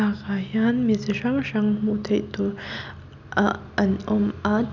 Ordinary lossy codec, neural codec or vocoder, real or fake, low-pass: none; none; real; 7.2 kHz